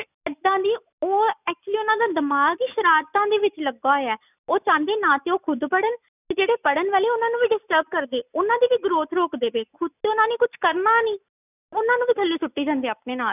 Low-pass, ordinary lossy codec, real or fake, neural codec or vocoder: 3.6 kHz; none; real; none